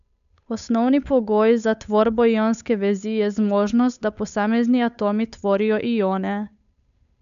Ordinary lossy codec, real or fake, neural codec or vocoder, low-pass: none; fake; codec, 16 kHz, 8 kbps, FunCodec, trained on Chinese and English, 25 frames a second; 7.2 kHz